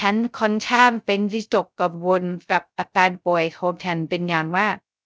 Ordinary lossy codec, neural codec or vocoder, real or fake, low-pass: none; codec, 16 kHz, 0.2 kbps, FocalCodec; fake; none